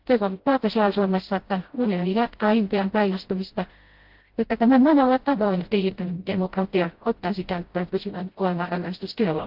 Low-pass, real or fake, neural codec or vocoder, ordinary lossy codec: 5.4 kHz; fake; codec, 16 kHz, 0.5 kbps, FreqCodec, smaller model; Opus, 16 kbps